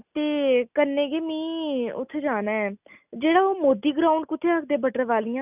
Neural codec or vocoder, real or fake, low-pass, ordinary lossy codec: none; real; 3.6 kHz; none